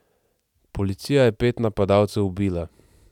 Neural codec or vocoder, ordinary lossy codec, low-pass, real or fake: none; none; 19.8 kHz; real